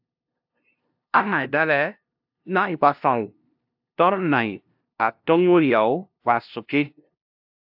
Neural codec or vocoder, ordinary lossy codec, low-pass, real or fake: codec, 16 kHz, 0.5 kbps, FunCodec, trained on LibriTTS, 25 frames a second; AAC, 48 kbps; 5.4 kHz; fake